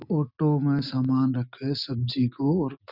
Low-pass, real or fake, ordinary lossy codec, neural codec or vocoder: 5.4 kHz; real; none; none